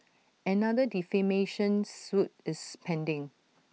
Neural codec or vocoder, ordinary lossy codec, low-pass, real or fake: none; none; none; real